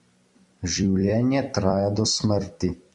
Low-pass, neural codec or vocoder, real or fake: 10.8 kHz; vocoder, 44.1 kHz, 128 mel bands every 256 samples, BigVGAN v2; fake